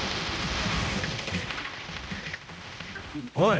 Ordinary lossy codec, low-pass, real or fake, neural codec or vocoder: none; none; fake; codec, 16 kHz, 0.5 kbps, X-Codec, HuBERT features, trained on general audio